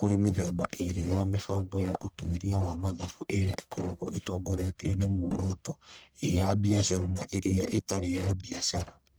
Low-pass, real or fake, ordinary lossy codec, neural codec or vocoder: none; fake; none; codec, 44.1 kHz, 1.7 kbps, Pupu-Codec